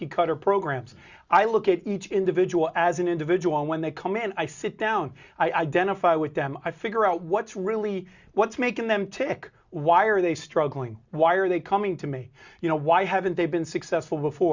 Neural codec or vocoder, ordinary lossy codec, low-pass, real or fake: none; Opus, 64 kbps; 7.2 kHz; real